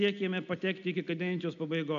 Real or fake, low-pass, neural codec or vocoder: real; 7.2 kHz; none